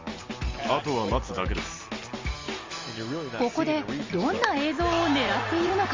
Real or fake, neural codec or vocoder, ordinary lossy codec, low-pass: real; none; Opus, 32 kbps; 7.2 kHz